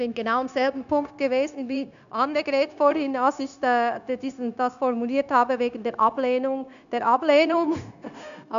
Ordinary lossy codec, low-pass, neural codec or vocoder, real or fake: none; 7.2 kHz; codec, 16 kHz, 0.9 kbps, LongCat-Audio-Codec; fake